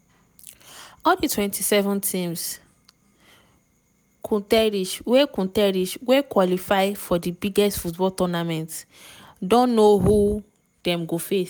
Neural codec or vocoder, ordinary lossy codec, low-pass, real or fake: none; none; none; real